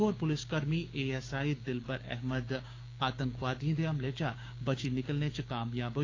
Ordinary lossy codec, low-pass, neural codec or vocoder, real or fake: AAC, 32 kbps; 7.2 kHz; codec, 16 kHz, 6 kbps, DAC; fake